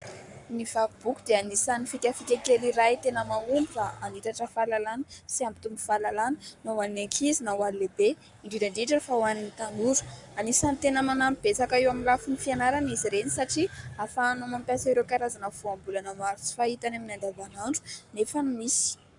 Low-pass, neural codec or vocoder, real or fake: 10.8 kHz; codec, 44.1 kHz, 7.8 kbps, Pupu-Codec; fake